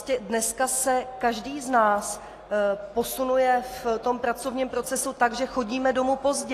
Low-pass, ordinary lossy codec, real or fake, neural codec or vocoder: 14.4 kHz; AAC, 48 kbps; real; none